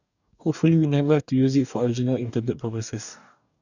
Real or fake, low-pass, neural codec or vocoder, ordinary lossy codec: fake; 7.2 kHz; codec, 44.1 kHz, 2.6 kbps, DAC; none